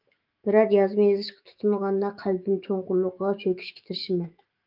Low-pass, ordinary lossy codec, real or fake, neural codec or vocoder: 5.4 kHz; Opus, 24 kbps; fake; autoencoder, 48 kHz, 128 numbers a frame, DAC-VAE, trained on Japanese speech